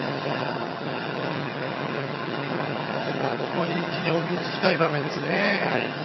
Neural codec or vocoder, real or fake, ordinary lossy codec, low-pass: vocoder, 22.05 kHz, 80 mel bands, HiFi-GAN; fake; MP3, 24 kbps; 7.2 kHz